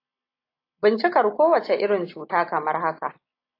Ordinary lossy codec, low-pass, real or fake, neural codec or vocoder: AAC, 32 kbps; 5.4 kHz; real; none